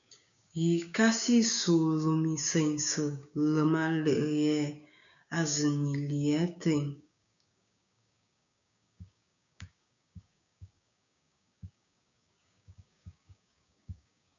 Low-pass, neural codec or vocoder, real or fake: 7.2 kHz; codec, 16 kHz, 6 kbps, DAC; fake